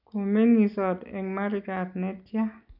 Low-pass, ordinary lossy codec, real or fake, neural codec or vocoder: 5.4 kHz; none; real; none